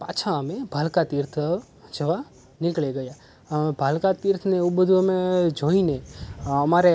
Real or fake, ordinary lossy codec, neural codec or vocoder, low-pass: real; none; none; none